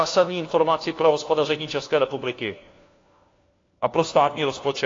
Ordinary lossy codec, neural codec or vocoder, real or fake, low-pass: AAC, 32 kbps; codec, 16 kHz, 1 kbps, FunCodec, trained on LibriTTS, 50 frames a second; fake; 7.2 kHz